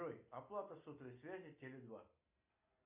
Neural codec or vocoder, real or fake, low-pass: none; real; 3.6 kHz